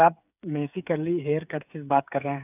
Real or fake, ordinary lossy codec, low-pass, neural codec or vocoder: fake; none; 3.6 kHz; codec, 16 kHz, 8 kbps, FreqCodec, smaller model